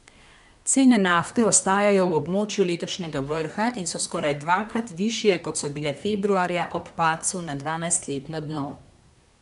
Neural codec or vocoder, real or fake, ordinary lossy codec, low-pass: codec, 24 kHz, 1 kbps, SNAC; fake; none; 10.8 kHz